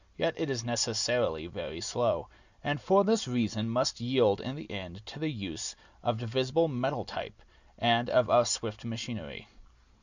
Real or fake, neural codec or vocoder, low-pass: real; none; 7.2 kHz